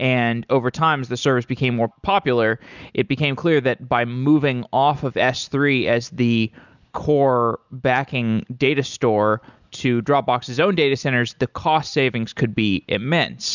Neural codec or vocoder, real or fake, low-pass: none; real; 7.2 kHz